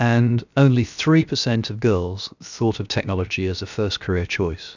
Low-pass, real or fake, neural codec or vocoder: 7.2 kHz; fake; codec, 16 kHz, 0.7 kbps, FocalCodec